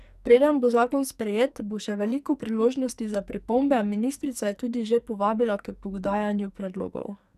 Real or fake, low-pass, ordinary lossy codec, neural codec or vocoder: fake; 14.4 kHz; none; codec, 44.1 kHz, 2.6 kbps, SNAC